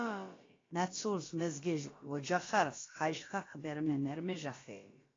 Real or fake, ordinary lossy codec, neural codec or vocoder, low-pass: fake; AAC, 32 kbps; codec, 16 kHz, about 1 kbps, DyCAST, with the encoder's durations; 7.2 kHz